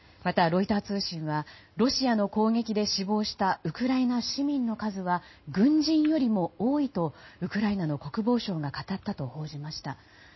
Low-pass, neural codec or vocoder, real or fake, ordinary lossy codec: 7.2 kHz; none; real; MP3, 24 kbps